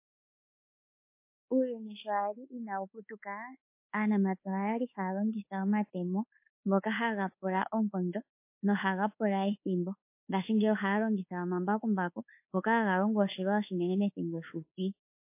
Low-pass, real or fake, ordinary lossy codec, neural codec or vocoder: 3.6 kHz; fake; MP3, 24 kbps; codec, 24 kHz, 1.2 kbps, DualCodec